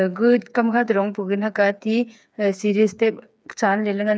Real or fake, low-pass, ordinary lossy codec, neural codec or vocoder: fake; none; none; codec, 16 kHz, 4 kbps, FreqCodec, smaller model